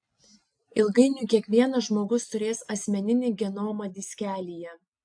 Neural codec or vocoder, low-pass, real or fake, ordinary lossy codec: none; 9.9 kHz; real; AAC, 64 kbps